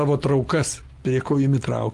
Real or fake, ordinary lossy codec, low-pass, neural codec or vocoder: real; Opus, 32 kbps; 14.4 kHz; none